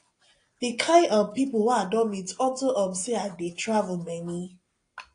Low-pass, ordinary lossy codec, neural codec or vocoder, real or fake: 9.9 kHz; AAC, 48 kbps; none; real